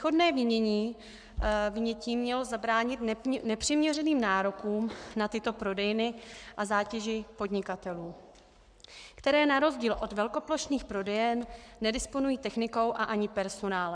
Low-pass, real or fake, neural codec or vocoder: 9.9 kHz; fake; codec, 44.1 kHz, 7.8 kbps, Pupu-Codec